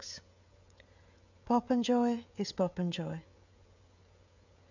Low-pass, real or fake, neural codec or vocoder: 7.2 kHz; fake; codec, 16 kHz, 16 kbps, FreqCodec, smaller model